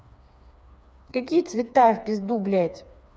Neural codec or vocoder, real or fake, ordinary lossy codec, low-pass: codec, 16 kHz, 4 kbps, FreqCodec, smaller model; fake; none; none